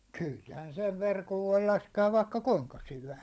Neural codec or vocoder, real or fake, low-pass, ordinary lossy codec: codec, 16 kHz, 16 kbps, FunCodec, trained on LibriTTS, 50 frames a second; fake; none; none